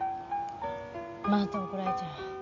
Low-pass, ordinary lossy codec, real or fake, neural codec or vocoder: 7.2 kHz; MP3, 64 kbps; real; none